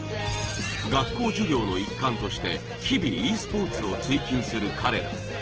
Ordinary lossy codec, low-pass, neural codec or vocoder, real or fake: Opus, 16 kbps; 7.2 kHz; none; real